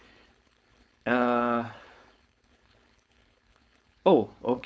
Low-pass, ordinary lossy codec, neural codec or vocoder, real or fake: none; none; codec, 16 kHz, 4.8 kbps, FACodec; fake